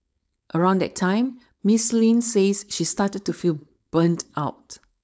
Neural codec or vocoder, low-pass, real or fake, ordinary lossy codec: codec, 16 kHz, 4.8 kbps, FACodec; none; fake; none